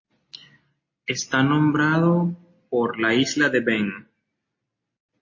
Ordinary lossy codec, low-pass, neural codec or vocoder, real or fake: MP3, 32 kbps; 7.2 kHz; none; real